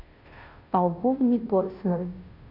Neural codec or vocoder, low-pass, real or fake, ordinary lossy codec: codec, 16 kHz, 0.5 kbps, FunCodec, trained on Chinese and English, 25 frames a second; 5.4 kHz; fake; none